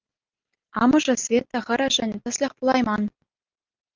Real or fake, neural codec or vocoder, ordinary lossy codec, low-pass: real; none; Opus, 32 kbps; 7.2 kHz